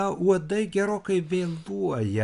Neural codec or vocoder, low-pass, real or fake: none; 10.8 kHz; real